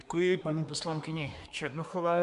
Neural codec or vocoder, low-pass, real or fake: codec, 24 kHz, 1 kbps, SNAC; 10.8 kHz; fake